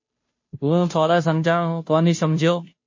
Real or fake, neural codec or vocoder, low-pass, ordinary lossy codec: fake; codec, 16 kHz, 0.5 kbps, FunCodec, trained on Chinese and English, 25 frames a second; 7.2 kHz; MP3, 32 kbps